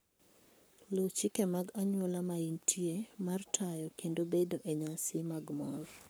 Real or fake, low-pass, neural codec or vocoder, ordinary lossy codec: fake; none; codec, 44.1 kHz, 7.8 kbps, Pupu-Codec; none